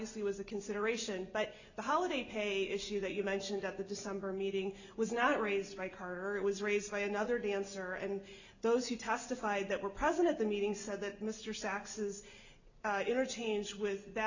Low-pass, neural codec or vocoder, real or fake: 7.2 kHz; none; real